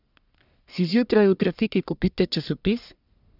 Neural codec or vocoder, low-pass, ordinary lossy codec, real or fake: codec, 44.1 kHz, 1.7 kbps, Pupu-Codec; 5.4 kHz; none; fake